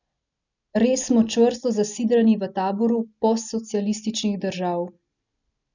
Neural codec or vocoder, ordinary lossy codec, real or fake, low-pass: none; none; real; 7.2 kHz